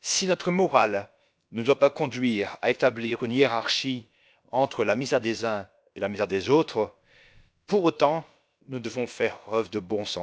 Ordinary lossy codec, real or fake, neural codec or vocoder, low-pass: none; fake; codec, 16 kHz, about 1 kbps, DyCAST, with the encoder's durations; none